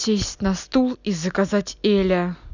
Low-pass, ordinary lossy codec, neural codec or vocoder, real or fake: 7.2 kHz; none; none; real